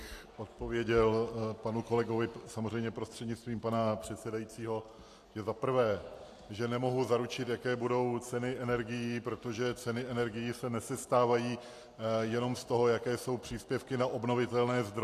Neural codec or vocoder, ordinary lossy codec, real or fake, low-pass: none; AAC, 64 kbps; real; 14.4 kHz